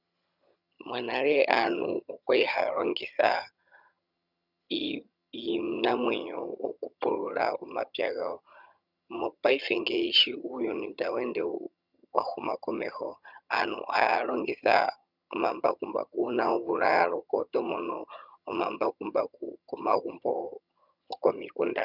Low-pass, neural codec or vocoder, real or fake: 5.4 kHz; vocoder, 22.05 kHz, 80 mel bands, HiFi-GAN; fake